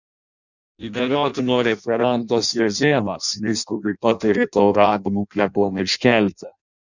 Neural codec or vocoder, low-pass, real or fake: codec, 16 kHz in and 24 kHz out, 0.6 kbps, FireRedTTS-2 codec; 7.2 kHz; fake